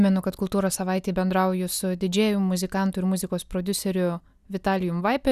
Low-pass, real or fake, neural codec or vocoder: 14.4 kHz; real; none